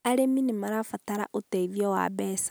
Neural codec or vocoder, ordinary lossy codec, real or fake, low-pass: none; none; real; none